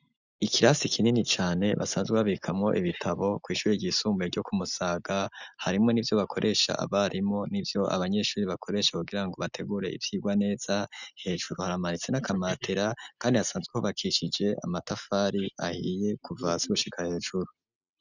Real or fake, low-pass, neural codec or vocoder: real; 7.2 kHz; none